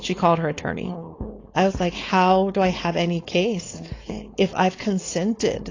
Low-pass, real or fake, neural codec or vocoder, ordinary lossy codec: 7.2 kHz; fake; codec, 16 kHz, 4.8 kbps, FACodec; AAC, 32 kbps